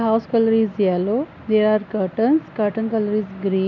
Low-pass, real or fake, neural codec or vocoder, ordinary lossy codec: 7.2 kHz; real; none; none